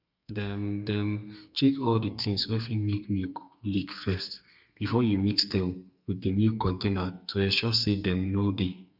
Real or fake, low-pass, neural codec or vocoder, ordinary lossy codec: fake; 5.4 kHz; codec, 32 kHz, 1.9 kbps, SNAC; none